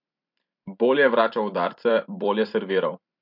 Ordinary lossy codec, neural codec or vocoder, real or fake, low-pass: MP3, 48 kbps; vocoder, 44.1 kHz, 128 mel bands every 256 samples, BigVGAN v2; fake; 5.4 kHz